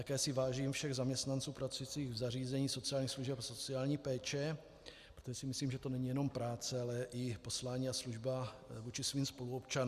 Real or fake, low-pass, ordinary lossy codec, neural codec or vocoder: real; 14.4 kHz; Opus, 64 kbps; none